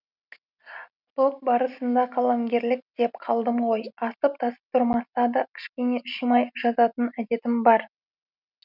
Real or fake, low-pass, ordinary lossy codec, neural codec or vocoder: real; 5.4 kHz; none; none